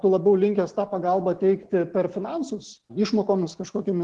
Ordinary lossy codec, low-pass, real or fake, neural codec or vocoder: Opus, 16 kbps; 10.8 kHz; real; none